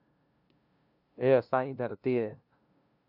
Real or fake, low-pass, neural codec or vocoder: fake; 5.4 kHz; codec, 16 kHz, 0.5 kbps, FunCodec, trained on LibriTTS, 25 frames a second